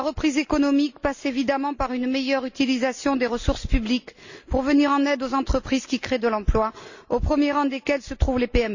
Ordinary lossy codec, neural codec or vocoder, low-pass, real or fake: Opus, 64 kbps; none; 7.2 kHz; real